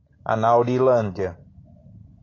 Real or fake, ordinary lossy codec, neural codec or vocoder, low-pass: real; AAC, 32 kbps; none; 7.2 kHz